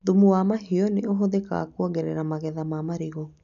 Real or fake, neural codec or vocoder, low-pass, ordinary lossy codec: real; none; 7.2 kHz; none